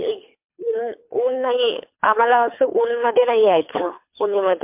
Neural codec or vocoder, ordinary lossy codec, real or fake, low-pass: codec, 24 kHz, 3 kbps, HILCodec; MP3, 32 kbps; fake; 3.6 kHz